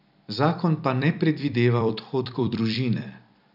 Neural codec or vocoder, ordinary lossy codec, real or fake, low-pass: vocoder, 44.1 kHz, 128 mel bands every 256 samples, BigVGAN v2; none; fake; 5.4 kHz